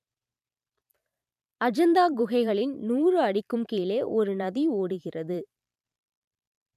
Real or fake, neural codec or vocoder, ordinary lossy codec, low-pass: fake; vocoder, 44.1 kHz, 128 mel bands every 512 samples, BigVGAN v2; none; 14.4 kHz